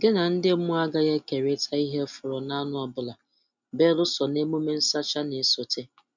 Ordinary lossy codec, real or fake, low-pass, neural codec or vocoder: none; real; 7.2 kHz; none